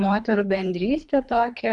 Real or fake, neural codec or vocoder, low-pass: fake; codec, 24 kHz, 3 kbps, HILCodec; 10.8 kHz